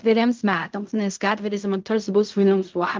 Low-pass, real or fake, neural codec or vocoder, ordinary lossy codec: 7.2 kHz; fake; codec, 16 kHz in and 24 kHz out, 0.4 kbps, LongCat-Audio-Codec, fine tuned four codebook decoder; Opus, 32 kbps